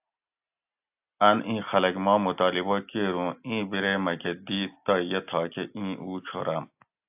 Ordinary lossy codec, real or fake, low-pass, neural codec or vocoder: AAC, 32 kbps; real; 3.6 kHz; none